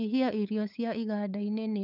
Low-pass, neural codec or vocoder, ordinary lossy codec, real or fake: 5.4 kHz; codec, 16 kHz, 8 kbps, FunCodec, trained on LibriTTS, 25 frames a second; none; fake